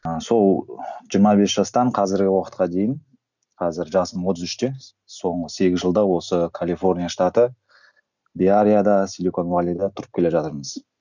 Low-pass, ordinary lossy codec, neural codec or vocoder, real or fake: 7.2 kHz; none; none; real